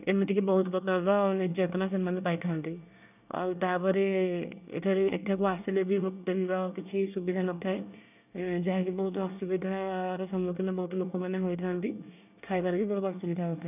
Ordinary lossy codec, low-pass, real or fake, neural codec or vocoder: none; 3.6 kHz; fake; codec, 24 kHz, 1 kbps, SNAC